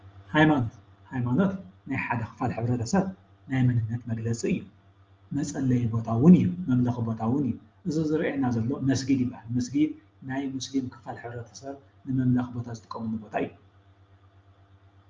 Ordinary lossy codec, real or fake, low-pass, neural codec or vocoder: Opus, 24 kbps; real; 7.2 kHz; none